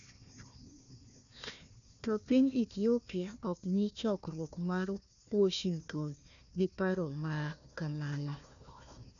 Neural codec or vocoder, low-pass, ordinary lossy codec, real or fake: codec, 16 kHz, 1 kbps, FunCodec, trained on Chinese and English, 50 frames a second; 7.2 kHz; none; fake